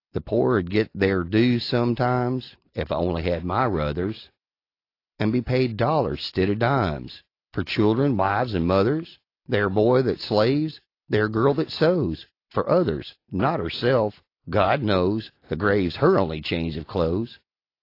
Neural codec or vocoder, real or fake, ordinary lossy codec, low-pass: none; real; AAC, 32 kbps; 5.4 kHz